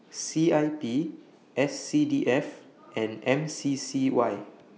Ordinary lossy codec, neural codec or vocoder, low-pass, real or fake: none; none; none; real